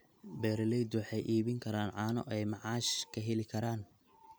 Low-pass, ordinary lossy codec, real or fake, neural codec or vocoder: none; none; real; none